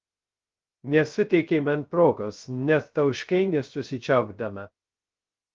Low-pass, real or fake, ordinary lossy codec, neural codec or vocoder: 7.2 kHz; fake; Opus, 32 kbps; codec, 16 kHz, 0.3 kbps, FocalCodec